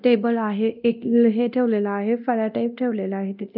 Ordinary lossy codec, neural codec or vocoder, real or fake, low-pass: none; codec, 24 kHz, 0.9 kbps, DualCodec; fake; 5.4 kHz